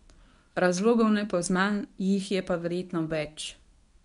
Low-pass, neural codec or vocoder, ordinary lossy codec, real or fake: 10.8 kHz; codec, 24 kHz, 0.9 kbps, WavTokenizer, medium speech release version 1; MP3, 96 kbps; fake